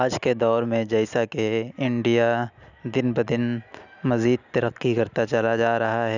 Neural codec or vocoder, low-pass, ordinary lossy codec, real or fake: none; 7.2 kHz; none; real